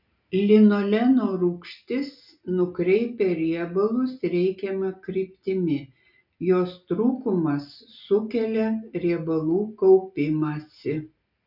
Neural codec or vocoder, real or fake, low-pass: none; real; 5.4 kHz